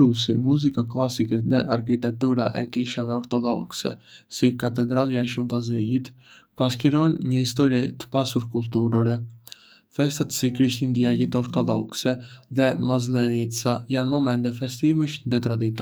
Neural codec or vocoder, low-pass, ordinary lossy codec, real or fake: codec, 44.1 kHz, 2.6 kbps, SNAC; none; none; fake